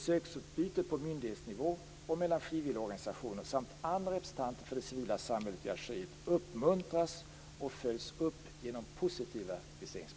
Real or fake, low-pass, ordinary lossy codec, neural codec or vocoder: real; none; none; none